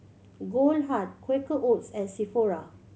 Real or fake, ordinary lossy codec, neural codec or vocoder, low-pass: real; none; none; none